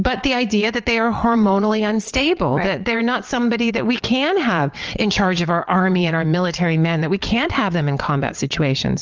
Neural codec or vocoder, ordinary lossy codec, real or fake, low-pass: vocoder, 22.05 kHz, 80 mel bands, WaveNeXt; Opus, 24 kbps; fake; 7.2 kHz